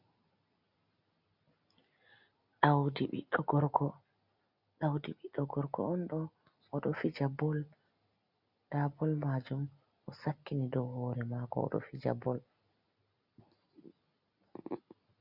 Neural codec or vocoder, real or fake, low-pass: none; real; 5.4 kHz